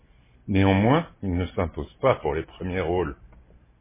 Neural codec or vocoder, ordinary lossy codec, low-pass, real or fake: none; MP3, 16 kbps; 3.6 kHz; real